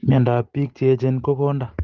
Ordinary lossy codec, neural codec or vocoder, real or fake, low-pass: Opus, 16 kbps; none; real; 7.2 kHz